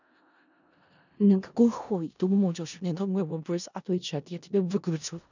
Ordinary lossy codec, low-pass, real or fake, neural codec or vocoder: none; 7.2 kHz; fake; codec, 16 kHz in and 24 kHz out, 0.4 kbps, LongCat-Audio-Codec, four codebook decoder